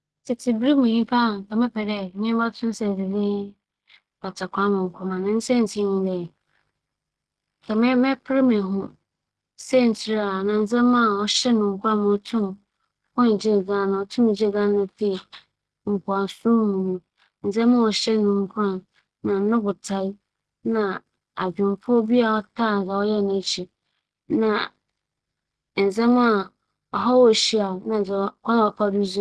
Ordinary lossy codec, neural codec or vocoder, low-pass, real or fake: Opus, 16 kbps; none; 10.8 kHz; real